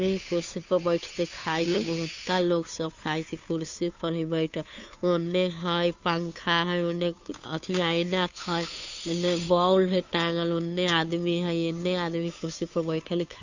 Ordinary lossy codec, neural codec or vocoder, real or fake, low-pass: Opus, 64 kbps; codec, 16 kHz, 2 kbps, FunCodec, trained on Chinese and English, 25 frames a second; fake; 7.2 kHz